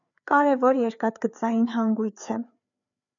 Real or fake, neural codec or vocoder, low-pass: fake; codec, 16 kHz, 4 kbps, FreqCodec, larger model; 7.2 kHz